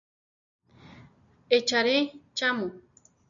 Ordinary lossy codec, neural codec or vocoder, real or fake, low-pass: MP3, 96 kbps; none; real; 7.2 kHz